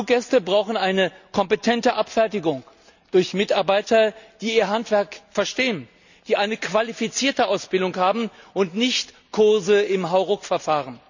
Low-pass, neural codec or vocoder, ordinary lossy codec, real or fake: 7.2 kHz; none; none; real